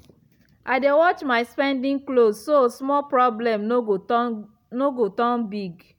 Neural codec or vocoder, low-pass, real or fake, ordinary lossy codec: none; 19.8 kHz; real; none